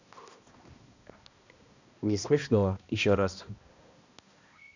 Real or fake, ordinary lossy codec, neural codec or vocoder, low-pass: fake; none; codec, 16 kHz, 1 kbps, X-Codec, HuBERT features, trained on balanced general audio; 7.2 kHz